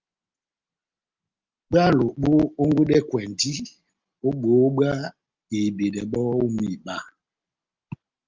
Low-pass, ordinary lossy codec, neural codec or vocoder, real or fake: 7.2 kHz; Opus, 32 kbps; none; real